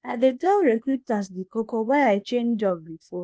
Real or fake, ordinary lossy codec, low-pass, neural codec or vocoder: fake; none; none; codec, 16 kHz, 0.8 kbps, ZipCodec